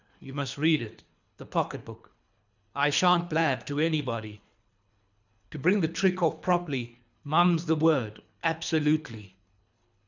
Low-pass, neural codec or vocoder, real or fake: 7.2 kHz; codec, 24 kHz, 3 kbps, HILCodec; fake